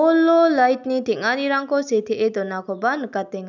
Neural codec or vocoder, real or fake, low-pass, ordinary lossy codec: none; real; 7.2 kHz; none